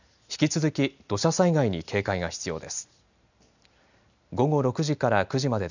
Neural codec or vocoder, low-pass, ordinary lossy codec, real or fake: none; 7.2 kHz; none; real